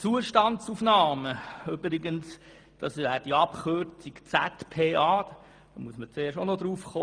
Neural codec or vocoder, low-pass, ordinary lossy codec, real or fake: none; 9.9 kHz; Opus, 32 kbps; real